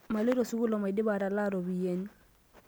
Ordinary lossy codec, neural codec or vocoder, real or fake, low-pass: none; none; real; none